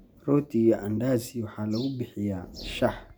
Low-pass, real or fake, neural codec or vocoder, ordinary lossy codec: none; real; none; none